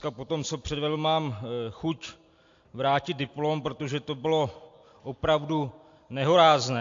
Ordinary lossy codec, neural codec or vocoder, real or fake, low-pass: AAC, 48 kbps; none; real; 7.2 kHz